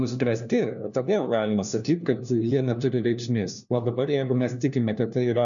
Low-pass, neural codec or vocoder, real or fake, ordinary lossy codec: 7.2 kHz; codec, 16 kHz, 1 kbps, FunCodec, trained on LibriTTS, 50 frames a second; fake; MP3, 96 kbps